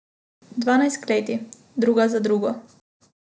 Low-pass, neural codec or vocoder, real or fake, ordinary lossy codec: none; none; real; none